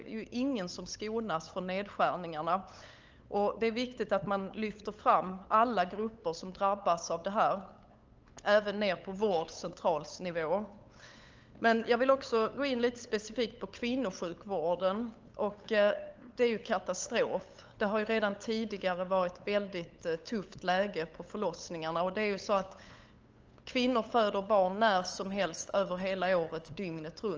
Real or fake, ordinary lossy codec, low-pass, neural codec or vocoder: fake; Opus, 24 kbps; 7.2 kHz; codec, 16 kHz, 8 kbps, FunCodec, trained on LibriTTS, 25 frames a second